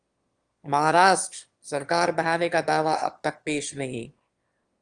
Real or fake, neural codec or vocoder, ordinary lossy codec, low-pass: fake; autoencoder, 22.05 kHz, a latent of 192 numbers a frame, VITS, trained on one speaker; Opus, 24 kbps; 9.9 kHz